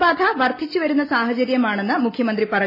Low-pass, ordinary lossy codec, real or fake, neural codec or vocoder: 5.4 kHz; MP3, 24 kbps; real; none